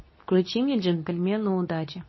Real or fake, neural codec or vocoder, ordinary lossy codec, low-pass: fake; codec, 24 kHz, 0.9 kbps, WavTokenizer, medium speech release version 2; MP3, 24 kbps; 7.2 kHz